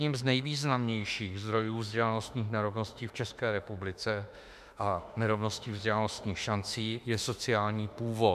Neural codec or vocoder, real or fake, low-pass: autoencoder, 48 kHz, 32 numbers a frame, DAC-VAE, trained on Japanese speech; fake; 14.4 kHz